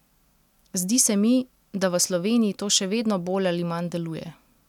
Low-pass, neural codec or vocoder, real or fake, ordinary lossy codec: 19.8 kHz; none; real; none